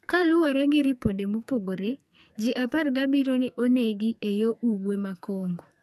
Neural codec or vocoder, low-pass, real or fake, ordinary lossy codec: codec, 44.1 kHz, 2.6 kbps, SNAC; 14.4 kHz; fake; none